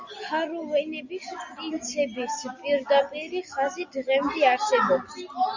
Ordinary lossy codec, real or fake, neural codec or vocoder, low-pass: Opus, 64 kbps; real; none; 7.2 kHz